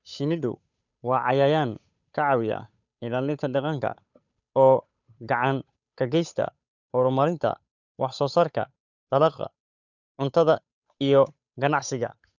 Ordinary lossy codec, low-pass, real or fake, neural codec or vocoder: none; 7.2 kHz; fake; codec, 16 kHz, 8 kbps, FunCodec, trained on Chinese and English, 25 frames a second